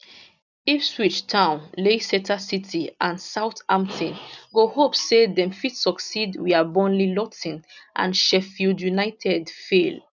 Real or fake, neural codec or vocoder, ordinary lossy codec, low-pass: real; none; none; 7.2 kHz